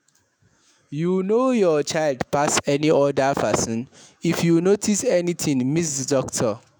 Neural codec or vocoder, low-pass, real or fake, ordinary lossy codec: autoencoder, 48 kHz, 128 numbers a frame, DAC-VAE, trained on Japanese speech; none; fake; none